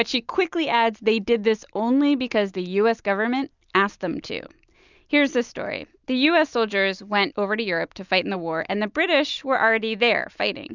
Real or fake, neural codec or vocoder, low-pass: real; none; 7.2 kHz